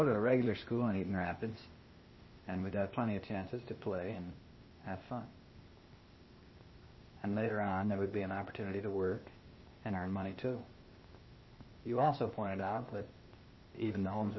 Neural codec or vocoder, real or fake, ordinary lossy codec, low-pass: codec, 16 kHz, 0.8 kbps, ZipCodec; fake; MP3, 24 kbps; 7.2 kHz